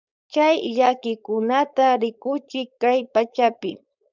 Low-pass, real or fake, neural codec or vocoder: 7.2 kHz; fake; codec, 16 kHz, 4.8 kbps, FACodec